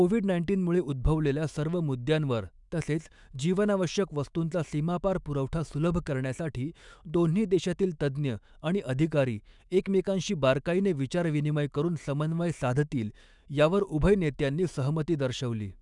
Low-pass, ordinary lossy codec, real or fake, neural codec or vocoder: 10.8 kHz; none; fake; autoencoder, 48 kHz, 128 numbers a frame, DAC-VAE, trained on Japanese speech